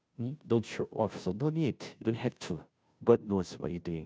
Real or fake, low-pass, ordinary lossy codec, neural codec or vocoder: fake; none; none; codec, 16 kHz, 0.5 kbps, FunCodec, trained on Chinese and English, 25 frames a second